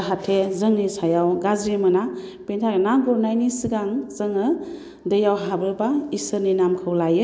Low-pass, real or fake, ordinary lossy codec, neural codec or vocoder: none; real; none; none